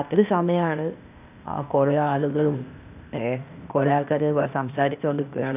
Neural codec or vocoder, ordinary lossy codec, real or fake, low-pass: codec, 16 kHz, 0.8 kbps, ZipCodec; none; fake; 3.6 kHz